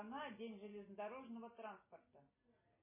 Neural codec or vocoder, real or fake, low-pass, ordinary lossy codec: none; real; 3.6 kHz; MP3, 16 kbps